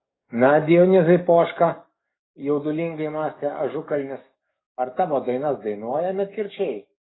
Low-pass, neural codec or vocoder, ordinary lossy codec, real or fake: 7.2 kHz; codec, 44.1 kHz, 7.8 kbps, DAC; AAC, 16 kbps; fake